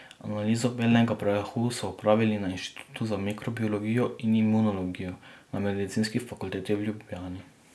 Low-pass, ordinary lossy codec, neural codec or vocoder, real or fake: none; none; none; real